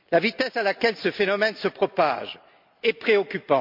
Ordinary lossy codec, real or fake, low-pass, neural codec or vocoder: MP3, 48 kbps; real; 5.4 kHz; none